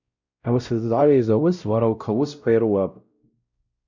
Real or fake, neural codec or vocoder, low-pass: fake; codec, 16 kHz, 0.5 kbps, X-Codec, WavLM features, trained on Multilingual LibriSpeech; 7.2 kHz